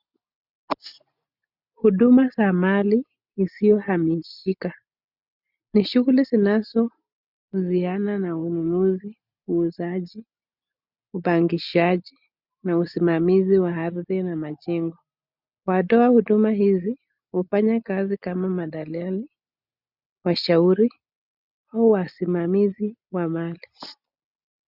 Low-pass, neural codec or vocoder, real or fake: 5.4 kHz; none; real